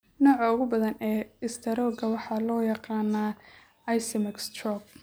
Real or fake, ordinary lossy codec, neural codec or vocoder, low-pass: real; none; none; none